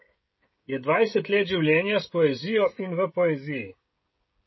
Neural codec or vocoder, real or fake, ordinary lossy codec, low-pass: codec, 16 kHz, 16 kbps, FreqCodec, smaller model; fake; MP3, 24 kbps; 7.2 kHz